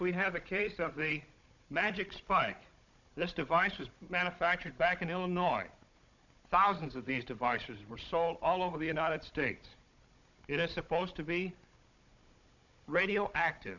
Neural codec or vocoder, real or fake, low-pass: codec, 16 kHz, 16 kbps, FunCodec, trained on Chinese and English, 50 frames a second; fake; 7.2 kHz